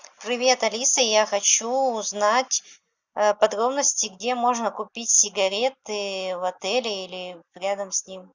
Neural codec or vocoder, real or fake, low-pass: none; real; 7.2 kHz